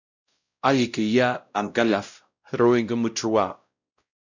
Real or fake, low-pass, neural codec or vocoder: fake; 7.2 kHz; codec, 16 kHz, 0.5 kbps, X-Codec, WavLM features, trained on Multilingual LibriSpeech